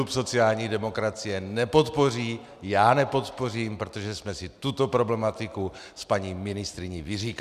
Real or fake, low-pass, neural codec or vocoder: real; 14.4 kHz; none